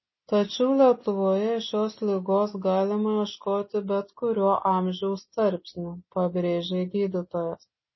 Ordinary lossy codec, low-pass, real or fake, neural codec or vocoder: MP3, 24 kbps; 7.2 kHz; real; none